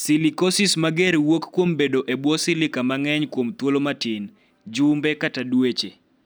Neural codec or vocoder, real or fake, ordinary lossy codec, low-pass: none; real; none; none